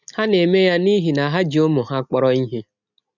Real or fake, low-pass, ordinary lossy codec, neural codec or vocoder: real; 7.2 kHz; none; none